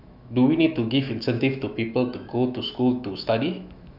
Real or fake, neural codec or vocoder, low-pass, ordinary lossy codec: fake; autoencoder, 48 kHz, 128 numbers a frame, DAC-VAE, trained on Japanese speech; 5.4 kHz; none